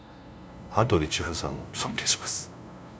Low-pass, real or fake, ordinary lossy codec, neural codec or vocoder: none; fake; none; codec, 16 kHz, 0.5 kbps, FunCodec, trained on LibriTTS, 25 frames a second